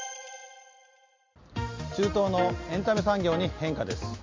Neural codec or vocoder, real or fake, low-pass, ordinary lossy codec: none; real; 7.2 kHz; MP3, 48 kbps